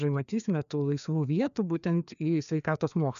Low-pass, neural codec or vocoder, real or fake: 7.2 kHz; codec, 16 kHz, 2 kbps, FreqCodec, larger model; fake